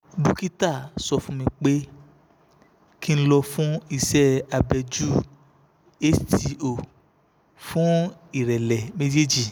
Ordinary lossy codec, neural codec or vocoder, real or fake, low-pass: none; none; real; none